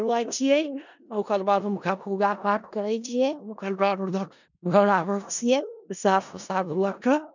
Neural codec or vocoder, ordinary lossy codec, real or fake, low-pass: codec, 16 kHz in and 24 kHz out, 0.4 kbps, LongCat-Audio-Codec, four codebook decoder; none; fake; 7.2 kHz